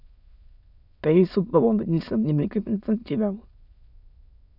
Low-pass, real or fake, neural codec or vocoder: 5.4 kHz; fake; autoencoder, 22.05 kHz, a latent of 192 numbers a frame, VITS, trained on many speakers